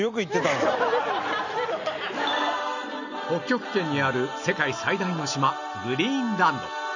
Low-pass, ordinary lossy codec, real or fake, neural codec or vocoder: 7.2 kHz; none; real; none